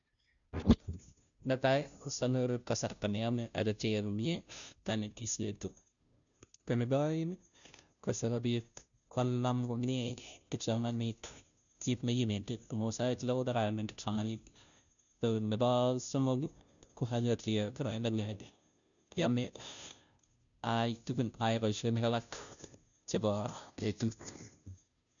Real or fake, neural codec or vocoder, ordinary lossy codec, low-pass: fake; codec, 16 kHz, 0.5 kbps, FunCodec, trained on Chinese and English, 25 frames a second; none; 7.2 kHz